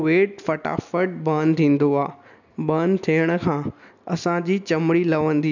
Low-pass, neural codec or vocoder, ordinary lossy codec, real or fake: 7.2 kHz; none; none; real